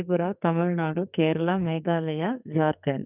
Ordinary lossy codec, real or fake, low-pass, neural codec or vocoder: none; fake; 3.6 kHz; codec, 44.1 kHz, 2.6 kbps, SNAC